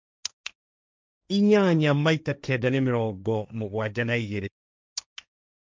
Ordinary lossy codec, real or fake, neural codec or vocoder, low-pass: none; fake; codec, 16 kHz, 1.1 kbps, Voila-Tokenizer; none